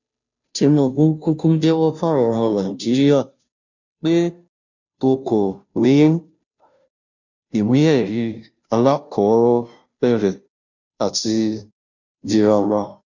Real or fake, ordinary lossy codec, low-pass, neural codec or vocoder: fake; none; 7.2 kHz; codec, 16 kHz, 0.5 kbps, FunCodec, trained on Chinese and English, 25 frames a second